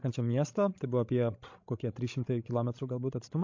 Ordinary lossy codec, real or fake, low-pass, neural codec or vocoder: MP3, 48 kbps; fake; 7.2 kHz; codec, 16 kHz, 16 kbps, FreqCodec, larger model